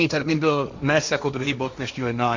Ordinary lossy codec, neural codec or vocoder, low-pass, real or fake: Opus, 64 kbps; codec, 16 kHz, 1.1 kbps, Voila-Tokenizer; 7.2 kHz; fake